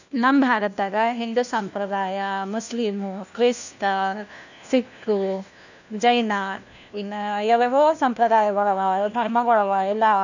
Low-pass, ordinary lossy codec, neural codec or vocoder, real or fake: 7.2 kHz; none; codec, 16 kHz, 1 kbps, FunCodec, trained on LibriTTS, 50 frames a second; fake